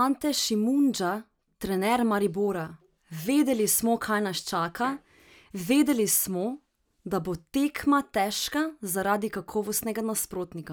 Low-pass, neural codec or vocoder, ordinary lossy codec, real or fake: none; none; none; real